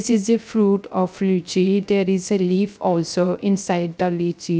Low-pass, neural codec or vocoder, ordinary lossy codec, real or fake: none; codec, 16 kHz, 0.3 kbps, FocalCodec; none; fake